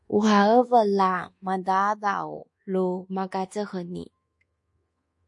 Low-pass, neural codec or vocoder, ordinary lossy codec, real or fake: 10.8 kHz; codec, 24 kHz, 1.2 kbps, DualCodec; MP3, 48 kbps; fake